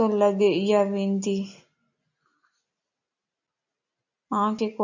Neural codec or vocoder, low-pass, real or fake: none; 7.2 kHz; real